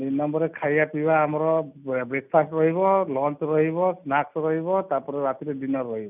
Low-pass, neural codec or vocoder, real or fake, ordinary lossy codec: 3.6 kHz; none; real; none